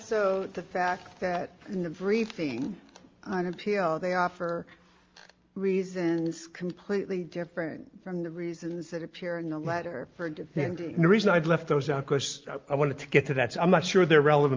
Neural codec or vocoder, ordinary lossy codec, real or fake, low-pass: none; Opus, 32 kbps; real; 7.2 kHz